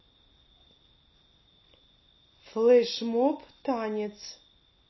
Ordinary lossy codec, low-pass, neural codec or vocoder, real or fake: MP3, 24 kbps; 7.2 kHz; none; real